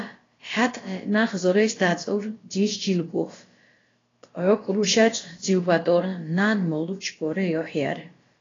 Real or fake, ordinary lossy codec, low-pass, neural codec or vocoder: fake; AAC, 32 kbps; 7.2 kHz; codec, 16 kHz, about 1 kbps, DyCAST, with the encoder's durations